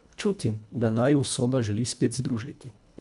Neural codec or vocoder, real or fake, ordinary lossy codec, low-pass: codec, 24 kHz, 1.5 kbps, HILCodec; fake; none; 10.8 kHz